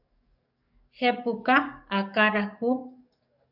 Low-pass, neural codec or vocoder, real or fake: 5.4 kHz; codec, 44.1 kHz, 7.8 kbps, DAC; fake